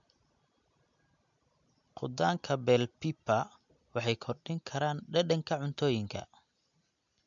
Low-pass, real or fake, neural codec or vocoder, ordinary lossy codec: 7.2 kHz; real; none; MP3, 64 kbps